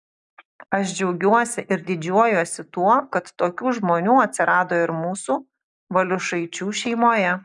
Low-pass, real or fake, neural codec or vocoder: 10.8 kHz; real; none